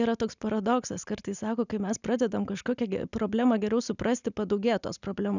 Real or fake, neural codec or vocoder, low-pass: real; none; 7.2 kHz